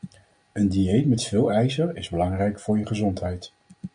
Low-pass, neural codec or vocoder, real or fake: 9.9 kHz; none; real